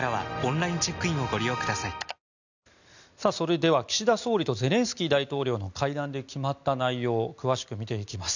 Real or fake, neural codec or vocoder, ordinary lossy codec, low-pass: real; none; none; 7.2 kHz